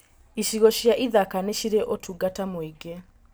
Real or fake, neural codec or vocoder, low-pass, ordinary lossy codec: real; none; none; none